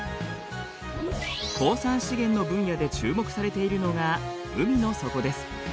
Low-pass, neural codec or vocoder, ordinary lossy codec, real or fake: none; none; none; real